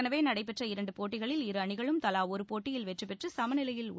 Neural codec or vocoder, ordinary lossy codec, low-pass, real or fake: none; none; none; real